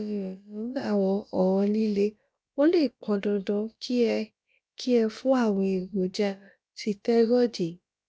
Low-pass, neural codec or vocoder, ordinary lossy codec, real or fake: none; codec, 16 kHz, about 1 kbps, DyCAST, with the encoder's durations; none; fake